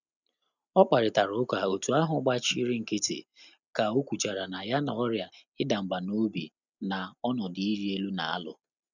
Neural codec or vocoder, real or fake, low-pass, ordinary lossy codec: none; real; 7.2 kHz; none